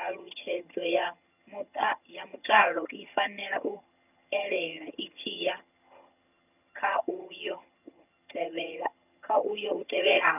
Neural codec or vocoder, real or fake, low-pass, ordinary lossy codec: vocoder, 22.05 kHz, 80 mel bands, HiFi-GAN; fake; 3.6 kHz; none